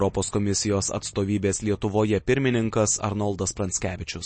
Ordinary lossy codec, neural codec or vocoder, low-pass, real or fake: MP3, 32 kbps; none; 9.9 kHz; real